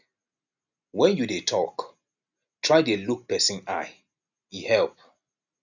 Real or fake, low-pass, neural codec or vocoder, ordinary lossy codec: real; 7.2 kHz; none; none